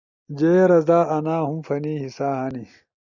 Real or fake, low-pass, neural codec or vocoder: real; 7.2 kHz; none